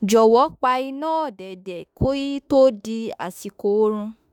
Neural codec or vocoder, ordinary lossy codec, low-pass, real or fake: autoencoder, 48 kHz, 32 numbers a frame, DAC-VAE, trained on Japanese speech; none; 19.8 kHz; fake